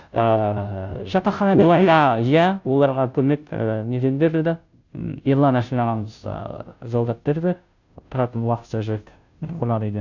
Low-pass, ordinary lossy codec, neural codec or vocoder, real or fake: 7.2 kHz; none; codec, 16 kHz, 0.5 kbps, FunCodec, trained on Chinese and English, 25 frames a second; fake